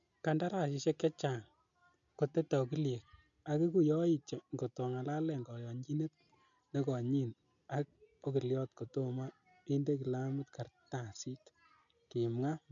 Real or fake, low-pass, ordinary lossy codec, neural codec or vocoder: real; 7.2 kHz; none; none